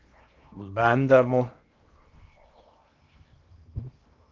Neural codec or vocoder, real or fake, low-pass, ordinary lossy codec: codec, 16 kHz in and 24 kHz out, 0.8 kbps, FocalCodec, streaming, 65536 codes; fake; 7.2 kHz; Opus, 32 kbps